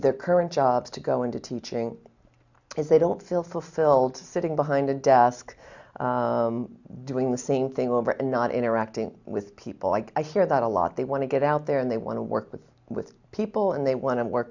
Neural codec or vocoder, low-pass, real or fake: none; 7.2 kHz; real